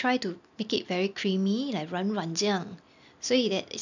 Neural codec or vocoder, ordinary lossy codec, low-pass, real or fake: none; none; 7.2 kHz; real